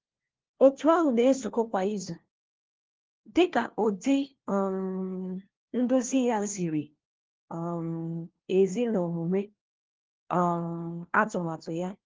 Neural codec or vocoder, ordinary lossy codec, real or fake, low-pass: codec, 16 kHz, 1 kbps, FunCodec, trained on LibriTTS, 50 frames a second; Opus, 16 kbps; fake; 7.2 kHz